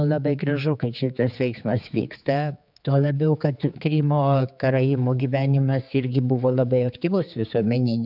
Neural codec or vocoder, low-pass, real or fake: codec, 16 kHz, 4 kbps, X-Codec, HuBERT features, trained on general audio; 5.4 kHz; fake